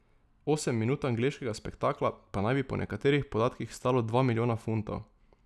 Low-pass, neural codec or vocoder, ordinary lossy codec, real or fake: none; none; none; real